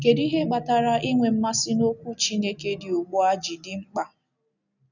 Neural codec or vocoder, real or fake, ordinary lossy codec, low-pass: none; real; none; 7.2 kHz